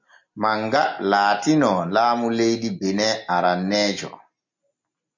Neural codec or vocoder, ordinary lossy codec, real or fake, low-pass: none; MP3, 48 kbps; real; 7.2 kHz